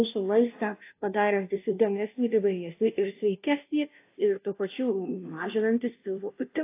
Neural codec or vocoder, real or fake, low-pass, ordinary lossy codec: codec, 16 kHz, 0.5 kbps, FunCodec, trained on Chinese and English, 25 frames a second; fake; 3.6 kHz; AAC, 24 kbps